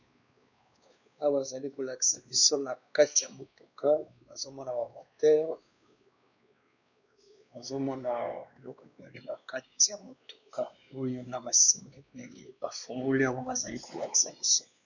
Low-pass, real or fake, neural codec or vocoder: 7.2 kHz; fake; codec, 16 kHz, 2 kbps, X-Codec, WavLM features, trained on Multilingual LibriSpeech